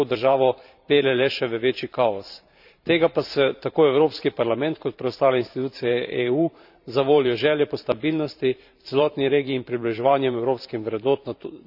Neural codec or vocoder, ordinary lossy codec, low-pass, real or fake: vocoder, 44.1 kHz, 128 mel bands every 512 samples, BigVGAN v2; none; 5.4 kHz; fake